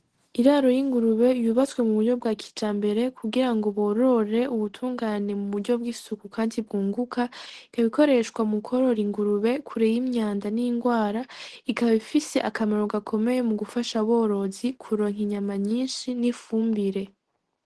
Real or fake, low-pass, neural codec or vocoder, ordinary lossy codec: real; 10.8 kHz; none; Opus, 16 kbps